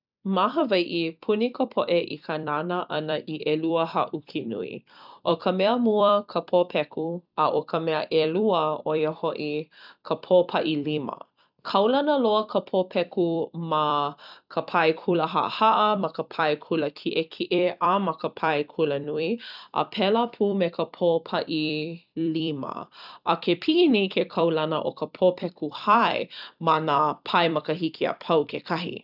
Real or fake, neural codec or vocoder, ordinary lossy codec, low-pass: fake; vocoder, 44.1 kHz, 128 mel bands every 256 samples, BigVGAN v2; none; 5.4 kHz